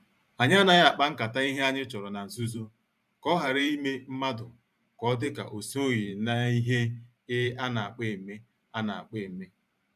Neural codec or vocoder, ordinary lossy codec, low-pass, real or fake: vocoder, 44.1 kHz, 128 mel bands every 512 samples, BigVGAN v2; none; 14.4 kHz; fake